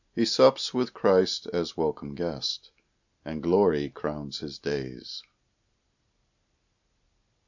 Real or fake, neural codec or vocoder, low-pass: real; none; 7.2 kHz